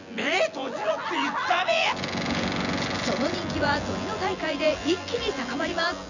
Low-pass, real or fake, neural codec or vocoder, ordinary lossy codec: 7.2 kHz; fake; vocoder, 24 kHz, 100 mel bands, Vocos; none